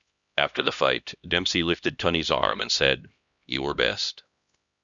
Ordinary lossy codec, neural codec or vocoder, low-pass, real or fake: Opus, 64 kbps; codec, 16 kHz, 1 kbps, X-Codec, HuBERT features, trained on LibriSpeech; 7.2 kHz; fake